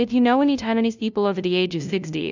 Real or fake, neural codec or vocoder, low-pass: fake; codec, 16 kHz, 0.5 kbps, FunCodec, trained on LibriTTS, 25 frames a second; 7.2 kHz